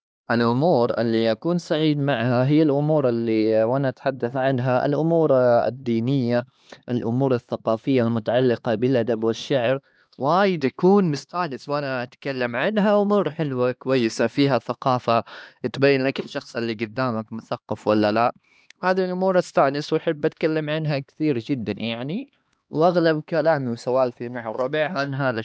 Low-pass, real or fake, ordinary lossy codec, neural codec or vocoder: none; fake; none; codec, 16 kHz, 2 kbps, X-Codec, HuBERT features, trained on LibriSpeech